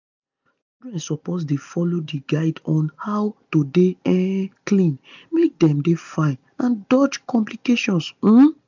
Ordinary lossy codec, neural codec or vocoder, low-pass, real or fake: none; none; 7.2 kHz; real